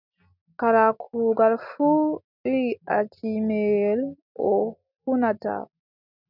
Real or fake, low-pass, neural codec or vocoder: real; 5.4 kHz; none